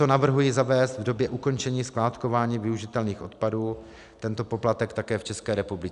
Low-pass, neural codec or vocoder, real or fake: 10.8 kHz; none; real